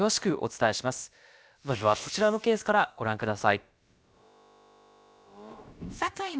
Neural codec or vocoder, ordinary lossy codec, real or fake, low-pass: codec, 16 kHz, about 1 kbps, DyCAST, with the encoder's durations; none; fake; none